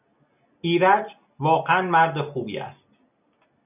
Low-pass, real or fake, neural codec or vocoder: 3.6 kHz; real; none